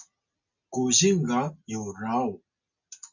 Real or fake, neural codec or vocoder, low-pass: real; none; 7.2 kHz